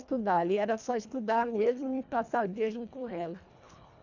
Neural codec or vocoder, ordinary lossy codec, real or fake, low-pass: codec, 24 kHz, 1.5 kbps, HILCodec; none; fake; 7.2 kHz